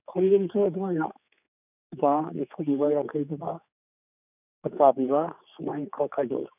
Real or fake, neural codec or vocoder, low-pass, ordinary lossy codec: fake; codec, 16 kHz, 4 kbps, X-Codec, HuBERT features, trained on general audio; 3.6 kHz; none